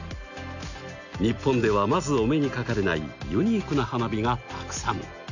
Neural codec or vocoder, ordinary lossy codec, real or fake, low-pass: none; none; real; 7.2 kHz